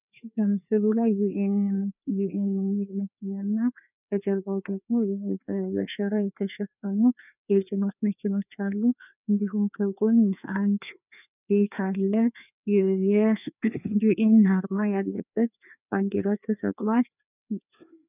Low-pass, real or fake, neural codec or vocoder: 3.6 kHz; fake; codec, 16 kHz, 2 kbps, FreqCodec, larger model